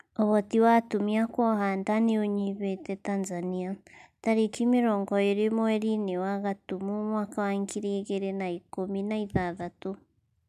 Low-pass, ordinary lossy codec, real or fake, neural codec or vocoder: 14.4 kHz; none; real; none